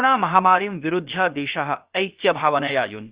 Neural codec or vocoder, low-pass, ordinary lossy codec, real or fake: codec, 16 kHz, about 1 kbps, DyCAST, with the encoder's durations; 3.6 kHz; Opus, 64 kbps; fake